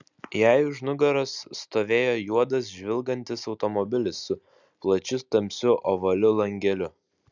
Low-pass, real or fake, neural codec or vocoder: 7.2 kHz; real; none